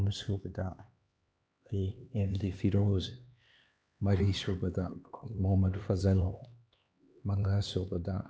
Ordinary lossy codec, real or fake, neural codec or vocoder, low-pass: none; fake; codec, 16 kHz, 2 kbps, X-Codec, HuBERT features, trained on LibriSpeech; none